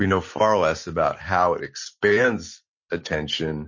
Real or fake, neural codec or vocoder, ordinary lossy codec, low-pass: real; none; MP3, 32 kbps; 7.2 kHz